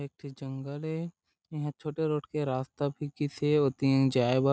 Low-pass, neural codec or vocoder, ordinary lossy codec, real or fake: none; none; none; real